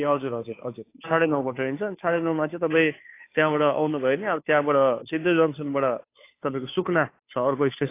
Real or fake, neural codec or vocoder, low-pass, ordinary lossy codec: fake; codec, 16 kHz, 2 kbps, FunCodec, trained on Chinese and English, 25 frames a second; 3.6 kHz; AAC, 24 kbps